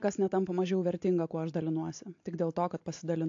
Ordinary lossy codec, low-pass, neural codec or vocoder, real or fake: AAC, 48 kbps; 7.2 kHz; none; real